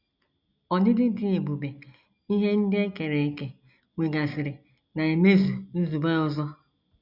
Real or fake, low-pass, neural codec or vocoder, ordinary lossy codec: real; 5.4 kHz; none; none